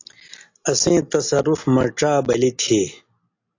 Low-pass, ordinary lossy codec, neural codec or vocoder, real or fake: 7.2 kHz; AAC, 48 kbps; none; real